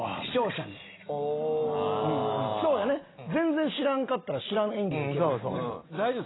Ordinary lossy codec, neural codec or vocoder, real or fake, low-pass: AAC, 16 kbps; none; real; 7.2 kHz